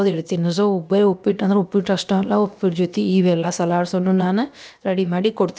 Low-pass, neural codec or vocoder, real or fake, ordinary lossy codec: none; codec, 16 kHz, about 1 kbps, DyCAST, with the encoder's durations; fake; none